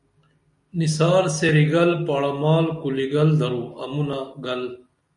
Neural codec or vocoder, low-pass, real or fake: none; 10.8 kHz; real